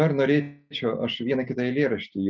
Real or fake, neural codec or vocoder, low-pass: real; none; 7.2 kHz